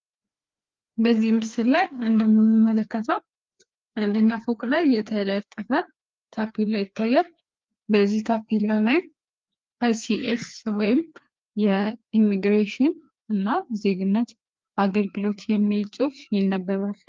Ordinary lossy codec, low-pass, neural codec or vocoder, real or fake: Opus, 32 kbps; 7.2 kHz; codec, 16 kHz, 2 kbps, FreqCodec, larger model; fake